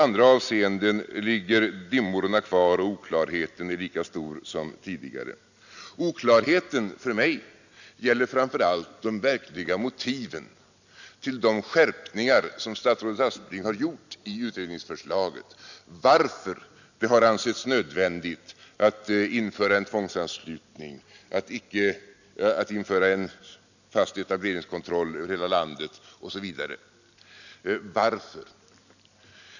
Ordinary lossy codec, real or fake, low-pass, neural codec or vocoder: none; real; 7.2 kHz; none